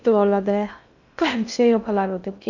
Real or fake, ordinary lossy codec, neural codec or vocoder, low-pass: fake; none; codec, 16 kHz in and 24 kHz out, 0.6 kbps, FocalCodec, streaming, 2048 codes; 7.2 kHz